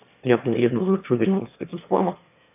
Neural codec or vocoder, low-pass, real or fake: autoencoder, 22.05 kHz, a latent of 192 numbers a frame, VITS, trained on one speaker; 3.6 kHz; fake